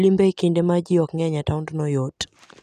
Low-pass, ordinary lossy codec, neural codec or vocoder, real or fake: 14.4 kHz; none; none; real